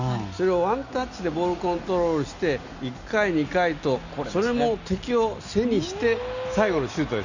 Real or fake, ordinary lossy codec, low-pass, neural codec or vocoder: real; none; 7.2 kHz; none